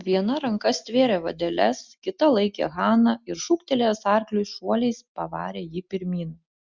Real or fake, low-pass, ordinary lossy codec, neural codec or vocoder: real; 7.2 kHz; Opus, 64 kbps; none